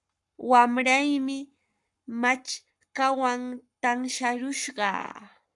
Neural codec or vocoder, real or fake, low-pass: codec, 44.1 kHz, 7.8 kbps, Pupu-Codec; fake; 10.8 kHz